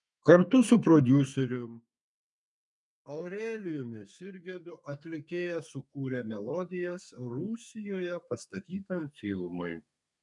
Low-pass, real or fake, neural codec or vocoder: 10.8 kHz; fake; codec, 32 kHz, 1.9 kbps, SNAC